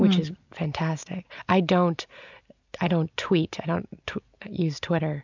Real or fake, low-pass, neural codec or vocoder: real; 7.2 kHz; none